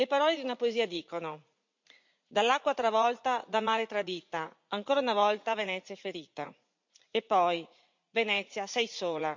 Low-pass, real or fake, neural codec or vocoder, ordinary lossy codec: 7.2 kHz; fake; vocoder, 44.1 kHz, 80 mel bands, Vocos; MP3, 64 kbps